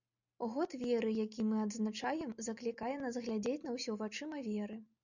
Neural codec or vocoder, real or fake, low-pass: none; real; 7.2 kHz